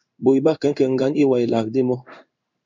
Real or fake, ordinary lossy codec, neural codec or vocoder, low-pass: fake; MP3, 64 kbps; codec, 16 kHz in and 24 kHz out, 1 kbps, XY-Tokenizer; 7.2 kHz